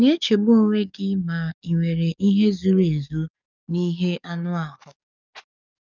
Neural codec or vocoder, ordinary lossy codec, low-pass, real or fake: codec, 44.1 kHz, 7.8 kbps, DAC; none; 7.2 kHz; fake